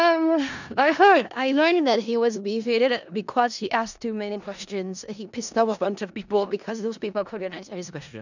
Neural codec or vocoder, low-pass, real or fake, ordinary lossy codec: codec, 16 kHz in and 24 kHz out, 0.4 kbps, LongCat-Audio-Codec, four codebook decoder; 7.2 kHz; fake; none